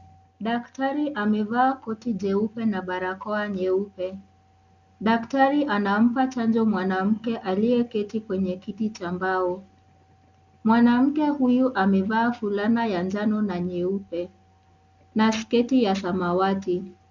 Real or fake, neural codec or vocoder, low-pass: real; none; 7.2 kHz